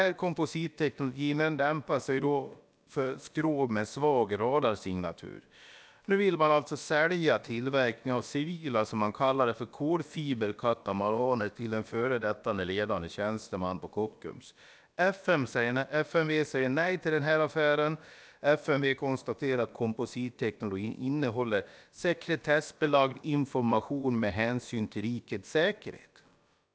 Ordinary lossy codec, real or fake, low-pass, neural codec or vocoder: none; fake; none; codec, 16 kHz, about 1 kbps, DyCAST, with the encoder's durations